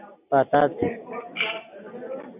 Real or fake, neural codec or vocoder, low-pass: real; none; 3.6 kHz